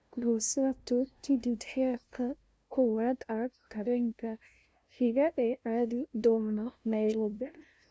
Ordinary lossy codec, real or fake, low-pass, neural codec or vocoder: none; fake; none; codec, 16 kHz, 0.5 kbps, FunCodec, trained on LibriTTS, 25 frames a second